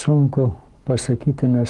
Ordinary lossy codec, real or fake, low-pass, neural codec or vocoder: Opus, 24 kbps; fake; 10.8 kHz; codec, 44.1 kHz, 7.8 kbps, Pupu-Codec